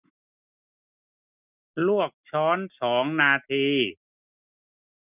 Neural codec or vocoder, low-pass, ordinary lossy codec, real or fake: none; 3.6 kHz; none; real